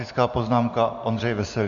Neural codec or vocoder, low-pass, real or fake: none; 7.2 kHz; real